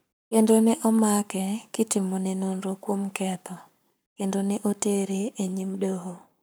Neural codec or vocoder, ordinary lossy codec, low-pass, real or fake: codec, 44.1 kHz, 7.8 kbps, Pupu-Codec; none; none; fake